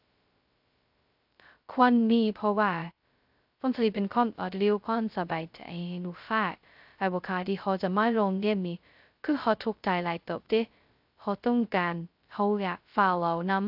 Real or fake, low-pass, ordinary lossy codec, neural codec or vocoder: fake; 5.4 kHz; AAC, 48 kbps; codec, 16 kHz, 0.2 kbps, FocalCodec